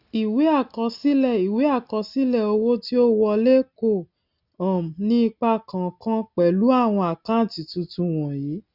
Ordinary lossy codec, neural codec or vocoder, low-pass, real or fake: none; none; 5.4 kHz; real